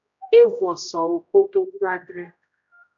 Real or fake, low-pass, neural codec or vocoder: fake; 7.2 kHz; codec, 16 kHz, 0.5 kbps, X-Codec, HuBERT features, trained on general audio